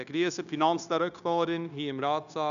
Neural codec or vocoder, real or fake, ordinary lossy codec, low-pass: codec, 16 kHz, 0.9 kbps, LongCat-Audio-Codec; fake; none; 7.2 kHz